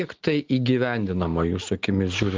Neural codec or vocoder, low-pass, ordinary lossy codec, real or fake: none; 7.2 kHz; Opus, 16 kbps; real